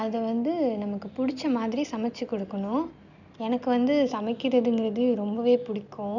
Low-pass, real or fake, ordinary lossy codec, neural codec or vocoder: 7.2 kHz; real; none; none